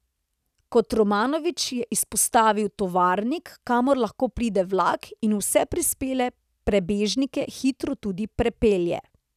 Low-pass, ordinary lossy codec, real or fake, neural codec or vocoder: 14.4 kHz; none; real; none